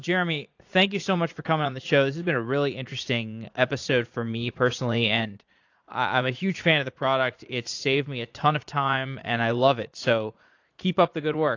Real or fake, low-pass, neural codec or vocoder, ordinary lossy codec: fake; 7.2 kHz; vocoder, 44.1 kHz, 80 mel bands, Vocos; AAC, 48 kbps